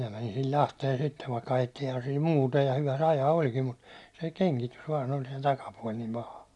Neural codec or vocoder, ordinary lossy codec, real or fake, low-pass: none; none; real; none